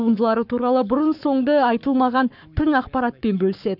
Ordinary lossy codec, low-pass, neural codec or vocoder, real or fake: none; 5.4 kHz; codec, 44.1 kHz, 7.8 kbps, Pupu-Codec; fake